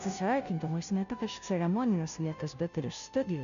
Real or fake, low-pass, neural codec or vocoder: fake; 7.2 kHz; codec, 16 kHz, 0.5 kbps, FunCodec, trained on Chinese and English, 25 frames a second